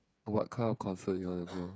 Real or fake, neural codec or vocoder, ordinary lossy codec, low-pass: fake; codec, 16 kHz, 8 kbps, FreqCodec, smaller model; none; none